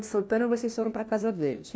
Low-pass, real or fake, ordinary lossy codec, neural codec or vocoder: none; fake; none; codec, 16 kHz, 1 kbps, FunCodec, trained on LibriTTS, 50 frames a second